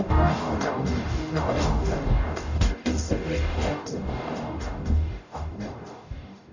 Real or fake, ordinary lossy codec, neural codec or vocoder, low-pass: fake; none; codec, 44.1 kHz, 0.9 kbps, DAC; 7.2 kHz